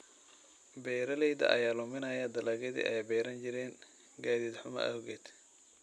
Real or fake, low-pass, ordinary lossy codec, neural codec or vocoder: real; none; none; none